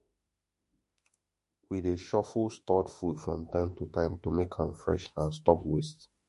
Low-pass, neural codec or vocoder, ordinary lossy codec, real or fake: 14.4 kHz; autoencoder, 48 kHz, 32 numbers a frame, DAC-VAE, trained on Japanese speech; MP3, 48 kbps; fake